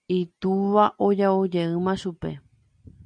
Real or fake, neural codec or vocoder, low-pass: real; none; 9.9 kHz